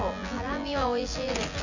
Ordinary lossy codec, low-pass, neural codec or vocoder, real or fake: none; 7.2 kHz; vocoder, 24 kHz, 100 mel bands, Vocos; fake